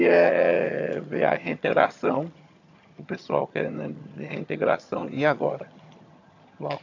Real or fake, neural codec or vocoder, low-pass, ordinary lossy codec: fake; vocoder, 22.05 kHz, 80 mel bands, HiFi-GAN; 7.2 kHz; AAC, 48 kbps